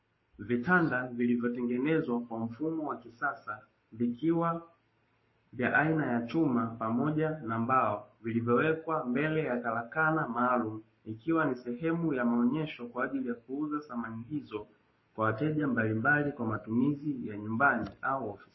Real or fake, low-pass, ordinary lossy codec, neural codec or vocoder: fake; 7.2 kHz; MP3, 24 kbps; codec, 44.1 kHz, 7.8 kbps, Pupu-Codec